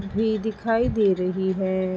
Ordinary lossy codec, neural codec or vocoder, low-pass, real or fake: none; none; none; real